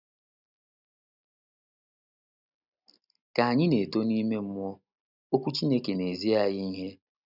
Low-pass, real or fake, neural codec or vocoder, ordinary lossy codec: 5.4 kHz; real; none; none